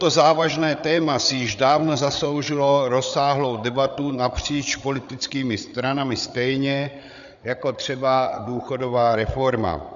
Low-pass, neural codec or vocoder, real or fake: 7.2 kHz; codec, 16 kHz, 8 kbps, FreqCodec, larger model; fake